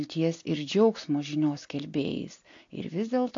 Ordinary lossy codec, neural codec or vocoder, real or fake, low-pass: AAC, 48 kbps; none; real; 7.2 kHz